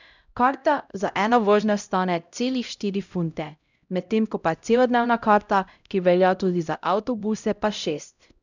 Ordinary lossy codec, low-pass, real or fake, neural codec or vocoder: none; 7.2 kHz; fake; codec, 16 kHz, 0.5 kbps, X-Codec, HuBERT features, trained on LibriSpeech